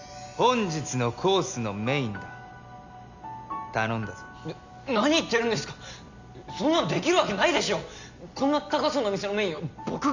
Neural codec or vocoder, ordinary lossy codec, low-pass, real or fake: none; Opus, 64 kbps; 7.2 kHz; real